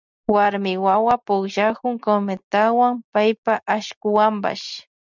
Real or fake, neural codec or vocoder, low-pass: real; none; 7.2 kHz